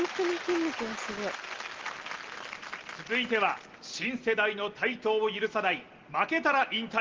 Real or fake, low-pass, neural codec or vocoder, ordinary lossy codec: real; 7.2 kHz; none; Opus, 16 kbps